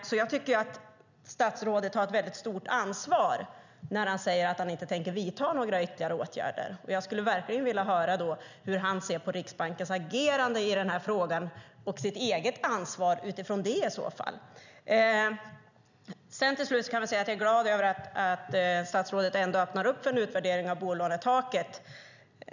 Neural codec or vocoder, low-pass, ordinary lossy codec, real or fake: none; 7.2 kHz; none; real